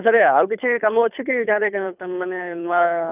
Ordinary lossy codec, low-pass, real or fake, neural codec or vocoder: none; 3.6 kHz; fake; codec, 24 kHz, 3 kbps, HILCodec